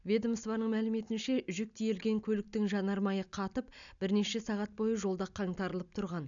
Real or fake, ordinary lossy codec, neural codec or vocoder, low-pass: real; none; none; 7.2 kHz